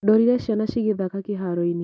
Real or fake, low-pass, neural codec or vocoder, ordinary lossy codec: real; none; none; none